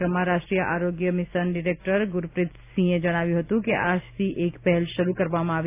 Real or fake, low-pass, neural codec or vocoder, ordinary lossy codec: real; 3.6 kHz; none; none